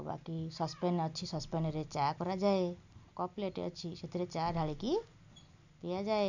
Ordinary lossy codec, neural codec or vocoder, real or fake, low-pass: none; none; real; 7.2 kHz